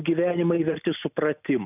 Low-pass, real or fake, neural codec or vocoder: 3.6 kHz; real; none